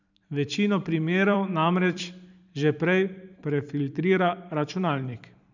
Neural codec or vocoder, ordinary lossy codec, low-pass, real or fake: vocoder, 44.1 kHz, 128 mel bands every 512 samples, BigVGAN v2; none; 7.2 kHz; fake